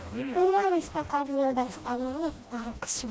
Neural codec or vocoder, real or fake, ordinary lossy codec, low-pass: codec, 16 kHz, 1 kbps, FreqCodec, smaller model; fake; none; none